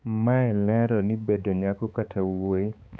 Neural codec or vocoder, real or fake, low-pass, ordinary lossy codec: codec, 16 kHz, 4 kbps, X-Codec, HuBERT features, trained on balanced general audio; fake; none; none